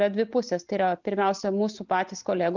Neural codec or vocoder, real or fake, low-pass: none; real; 7.2 kHz